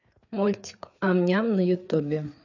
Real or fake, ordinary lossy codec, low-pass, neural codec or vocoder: fake; none; 7.2 kHz; codec, 16 kHz, 4 kbps, FreqCodec, larger model